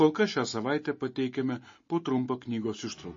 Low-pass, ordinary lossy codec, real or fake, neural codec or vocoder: 7.2 kHz; MP3, 32 kbps; real; none